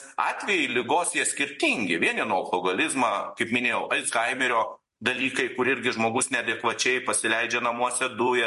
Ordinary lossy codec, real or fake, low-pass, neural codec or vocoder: MP3, 48 kbps; fake; 14.4 kHz; vocoder, 44.1 kHz, 128 mel bands every 256 samples, BigVGAN v2